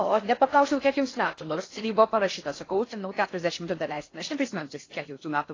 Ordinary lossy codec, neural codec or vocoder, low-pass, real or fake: AAC, 32 kbps; codec, 16 kHz in and 24 kHz out, 0.6 kbps, FocalCodec, streaming, 4096 codes; 7.2 kHz; fake